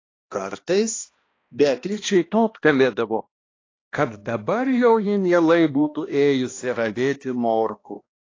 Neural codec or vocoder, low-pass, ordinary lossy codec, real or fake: codec, 16 kHz, 1 kbps, X-Codec, HuBERT features, trained on balanced general audio; 7.2 kHz; AAC, 32 kbps; fake